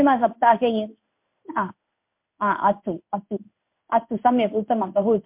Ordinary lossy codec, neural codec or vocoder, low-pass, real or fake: MP3, 32 kbps; codec, 16 kHz in and 24 kHz out, 1 kbps, XY-Tokenizer; 3.6 kHz; fake